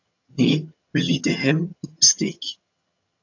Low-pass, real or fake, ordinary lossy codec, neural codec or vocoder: 7.2 kHz; fake; AAC, 48 kbps; vocoder, 22.05 kHz, 80 mel bands, HiFi-GAN